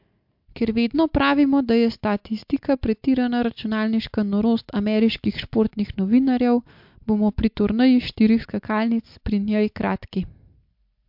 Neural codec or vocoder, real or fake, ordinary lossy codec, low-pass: none; real; MP3, 48 kbps; 5.4 kHz